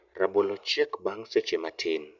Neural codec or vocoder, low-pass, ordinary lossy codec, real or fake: none; 7.2 kHz; none; real